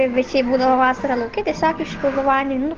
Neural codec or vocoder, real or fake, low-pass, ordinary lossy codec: none; real; 7.2 kHz; Opus, 16 kbps